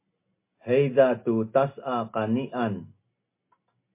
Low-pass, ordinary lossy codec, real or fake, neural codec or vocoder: 3.6 kHz; MP3, 24 kbps; real; none